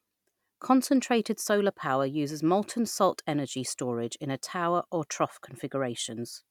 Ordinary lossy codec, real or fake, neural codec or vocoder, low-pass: none; real; none; 19.8 kHz